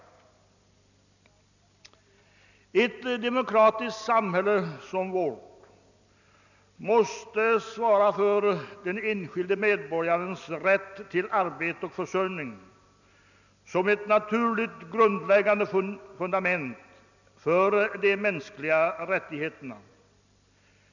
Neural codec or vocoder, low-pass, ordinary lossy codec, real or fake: none; 7.2 kHz; none; real